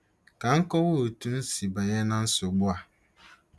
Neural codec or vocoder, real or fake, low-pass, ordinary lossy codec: none; real; none; none